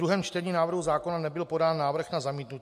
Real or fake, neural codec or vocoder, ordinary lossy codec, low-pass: real; none; MP3, 64 kbps; 14.4 kHz